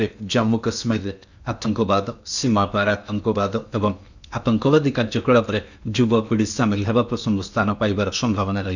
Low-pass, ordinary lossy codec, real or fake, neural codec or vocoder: 7.2 kHz; none; fake; codec, 16 kHz in and 24 kHz out, 0.8 kbps, FocalCodec, streaming, 65536 codes